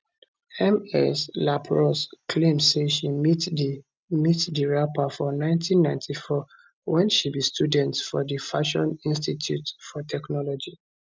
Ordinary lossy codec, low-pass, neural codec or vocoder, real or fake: none; none; none; real